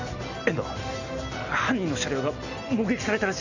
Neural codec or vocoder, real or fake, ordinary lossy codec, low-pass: none; real; none; 7.2 kHz